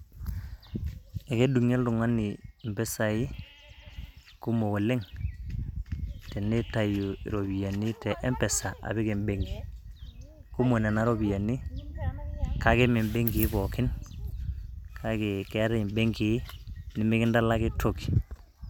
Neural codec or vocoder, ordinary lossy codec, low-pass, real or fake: none; none; 19.8 kHz; real